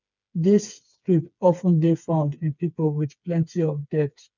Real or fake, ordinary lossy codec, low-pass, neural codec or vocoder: fake; none; 7.2 kHz; codec, 16 kHz, 4 kbps, FreqCodec, smaller model